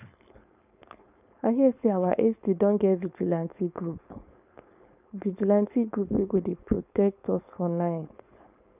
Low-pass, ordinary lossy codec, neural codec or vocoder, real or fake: 3.6 kHz; none; codec, 16 kHz, 4.8 kbps, FACodec; fake